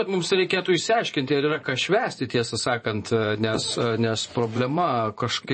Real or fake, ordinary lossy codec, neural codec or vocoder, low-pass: fake; MP3, 32 kbps; vocoder, 24 kHz, 100 mel bands, Vocos; 9.9 kHz